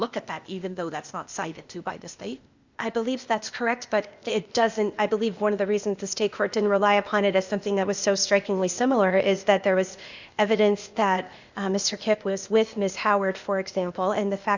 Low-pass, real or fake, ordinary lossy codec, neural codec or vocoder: 7.2 kHz; fake; Opus, 64 kbps; codec, 16 kHz, 0.8 kbps, ZipCodec